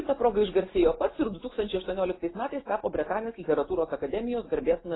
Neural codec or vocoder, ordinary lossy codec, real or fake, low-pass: none; AAC, 16 kbps; real; 7.2 kHz